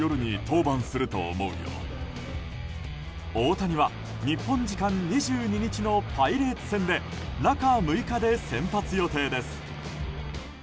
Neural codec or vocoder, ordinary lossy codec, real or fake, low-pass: none; none; real; none